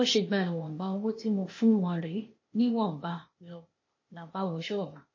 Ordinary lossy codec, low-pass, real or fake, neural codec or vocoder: MP3, 32 kbps; 7.2 kHz; fake; codec, 16 kHz, 0.8 kbps, ZipCodec